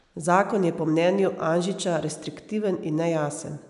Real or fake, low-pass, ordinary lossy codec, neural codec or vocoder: real; 10.8 kHz; none; none